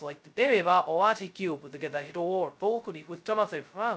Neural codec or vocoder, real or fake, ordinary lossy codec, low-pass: codec, 16 kHz, 0.2 kbps, FocalCodec; fake; none; none